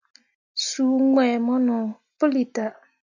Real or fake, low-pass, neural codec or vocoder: real; 7.2 kHz; none